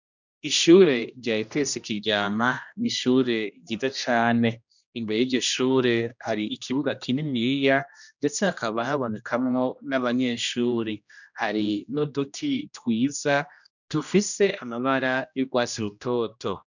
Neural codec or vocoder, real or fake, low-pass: codec, 16 kHz, 1 kbps, X-Codec, HuBERT features, trained on general audio; fake; 7.2 kHz